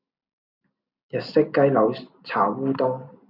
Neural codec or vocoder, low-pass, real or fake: none; 5.4 kHz; real